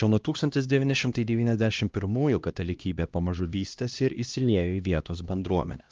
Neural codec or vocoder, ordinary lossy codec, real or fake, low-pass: codec, 16 kHz, 1 kbps, X-Codec, HuBERT features, trained on LibriSpeech; Opus, 32 kbps; fake; 7.2 kHz